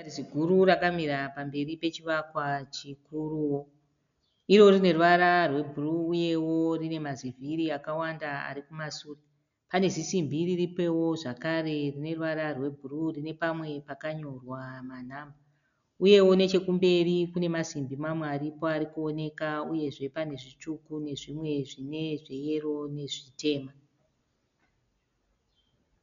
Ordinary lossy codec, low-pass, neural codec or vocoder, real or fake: MP3, 96 kbps; 7.2 kHz; none; real